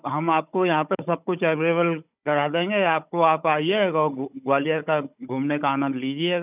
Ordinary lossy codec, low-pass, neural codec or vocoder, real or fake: none; 3.6 kHz; codec, 16 kHz, 16 kbps, FunCodec, trained on Chinese and English, 50 frames a second; fake